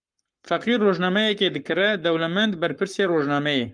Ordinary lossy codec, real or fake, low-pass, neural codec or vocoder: Opus, 32 kbps; fake; 9.9 kHz; codec, 44.1 kHz, 7.8 kbps, Pupu-Codec